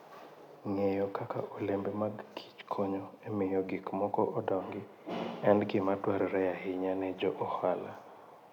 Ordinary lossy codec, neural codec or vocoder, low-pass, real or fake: none; none; 19.8 kHz; real